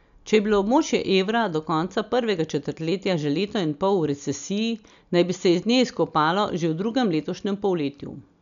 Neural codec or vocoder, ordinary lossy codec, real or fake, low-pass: none; none; real; 7.2 kHz